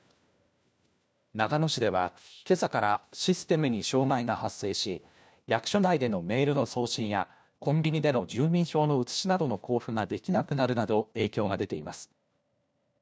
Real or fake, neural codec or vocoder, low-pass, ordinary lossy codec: fake; codec, 16 kHz, 1 kbps, FunCodec, trained on LibriTTS, 50 frames a second; none; none